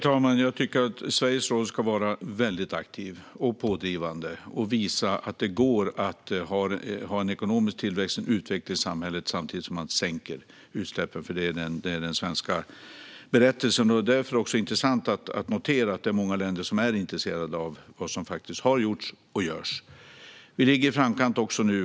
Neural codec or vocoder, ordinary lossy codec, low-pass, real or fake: none; none; none; real